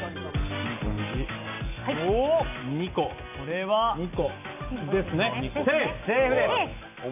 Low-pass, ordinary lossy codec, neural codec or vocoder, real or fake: 3.6 kHz; none; none; real